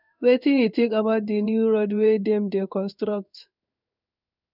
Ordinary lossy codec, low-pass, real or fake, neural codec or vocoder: none; 5.4 kHz; fake; codec, 16 kHz in and 24 kHz out, 1 kbps, XY-Tokenizer